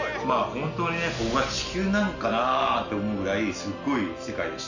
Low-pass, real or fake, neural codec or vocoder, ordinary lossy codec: 7.2 kHz; real; none; none